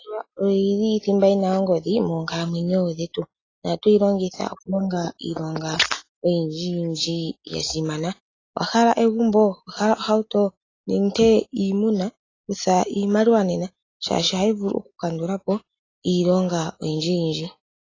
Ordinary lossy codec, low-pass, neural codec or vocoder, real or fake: AAC, 32 kbps; 7.2 kHz; none; real